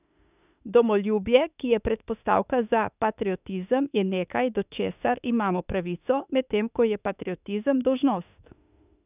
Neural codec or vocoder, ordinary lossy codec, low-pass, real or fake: autoencoder, 48 kHz, 32 numbers a frame, DAC-VAE, trained on Japanese speech; none; 3.6 kHz; fake